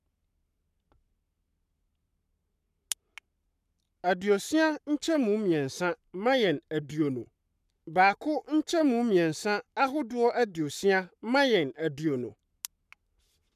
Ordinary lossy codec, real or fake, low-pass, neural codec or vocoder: none; fake; 14.4 kHz; codec, 44.1 kHz, 7.8 kbps, Pupu-Codec